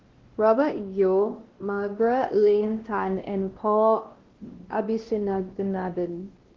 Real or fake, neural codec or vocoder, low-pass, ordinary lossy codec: fake; codec, 16 kHz, 1 kbps, X-Codec, WavLM features, trained on Multilingual LibriSpeech; 7.2 kHz; Opus, 16 kbps